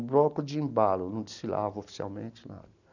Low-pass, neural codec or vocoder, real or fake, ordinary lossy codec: 7.2 kHz; none; real; none